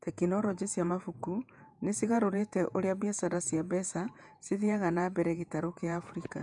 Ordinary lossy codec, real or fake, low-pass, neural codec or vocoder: none; fake; 10.8 kHz; vocoder, 48 kHz, 128 mel bands, Vocos